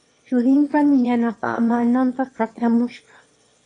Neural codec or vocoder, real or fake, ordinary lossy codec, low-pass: autoencoder, 22.05 kHz, a latent of 192 numbers a frame, VITS, trained on one speaker; fake; AAC, 48 kbps; 9.9 kHz